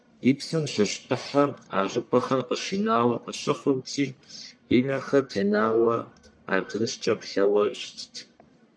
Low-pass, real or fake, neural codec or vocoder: 9.9 kHz; fake; codec, 44.1 kHz, 1.7 kbps, Pupu-Codec